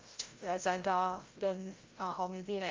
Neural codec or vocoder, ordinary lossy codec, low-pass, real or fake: codec, 16 kHz, 0.5 kbps, FreqCodec, larger model; Opus, 32 kbps; 7.2 kHz; fake